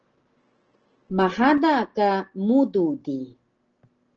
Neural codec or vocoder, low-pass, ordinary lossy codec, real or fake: none; 7.2 kHz; Opus, 16 kbps; real